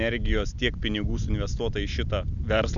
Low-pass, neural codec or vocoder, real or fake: 7.2 kHz; none; real